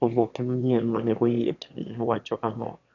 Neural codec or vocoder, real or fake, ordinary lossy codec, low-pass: autoencoder, 22.05 kHz, a latent of 192 numbers a frame, VITS, trained on one speaker; fake; none; 7.2 kHz